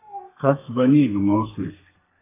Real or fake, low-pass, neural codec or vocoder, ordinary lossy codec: fake; 3.6 kHz; codec, 32 kHz, 1.9 kbps, SNAC; AAC, 16 kbps